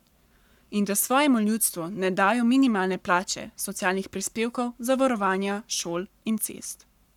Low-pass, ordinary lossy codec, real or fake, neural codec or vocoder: 19.8 kHz; none; fake; codec, 44.1 kHz, 7.8 kbps, Pupu-Codec